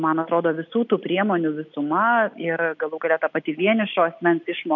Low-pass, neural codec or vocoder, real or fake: 7.2 kHz; none; real